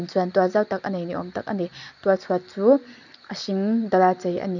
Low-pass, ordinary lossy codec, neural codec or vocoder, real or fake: 7.2 kHz; none; none; real